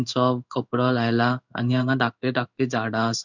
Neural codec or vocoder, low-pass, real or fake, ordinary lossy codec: codec, 16 kHz in and 24 kHz out, 1 kbps, XY-Tokenizer; 7.2 kHz; fake; none